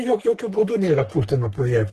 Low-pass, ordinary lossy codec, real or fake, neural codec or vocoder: 14.4 kHz; Opus, 16 kbps; fake; codec, 44.1 kHz, 3.4 kbps, Pupu-Codec